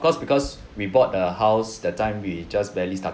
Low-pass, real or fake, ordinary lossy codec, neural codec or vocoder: none; real; none; none